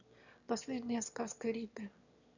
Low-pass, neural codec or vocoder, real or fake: 7.2 kHz; autoencoder, 22.05 kHz, a latent of 192 numbers a frame, VITS, trained on one speaker; fake